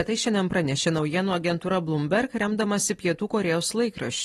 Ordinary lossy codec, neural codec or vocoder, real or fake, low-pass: AAC, 32 kbps; none; real; 19.8 kHz